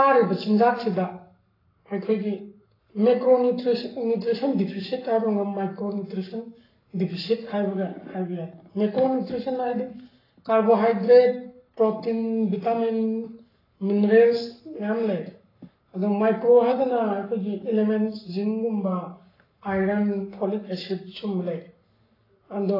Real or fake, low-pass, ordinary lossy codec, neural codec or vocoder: fake; 5.4 kHz; AAC, 24 kbps; codec, 44.1 kHz, 7.8 kbps, Pupu-Codec